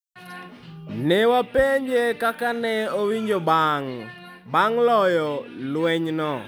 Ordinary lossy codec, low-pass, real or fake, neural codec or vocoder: none; none; real; none